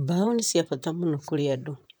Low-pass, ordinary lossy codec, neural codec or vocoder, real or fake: none; none; vocoder, 44.1 kHz, 128 mel bands, Pupu-Vocoder; fake